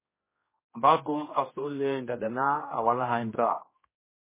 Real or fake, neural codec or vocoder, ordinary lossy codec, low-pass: fake; codec, 16 kHz, 1 kbps, X-Codec, HuBERT features, trained on general audio; MP3, 16 kbps; 3.6 kHz